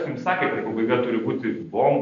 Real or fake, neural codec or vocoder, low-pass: real; none; 7.2 kHz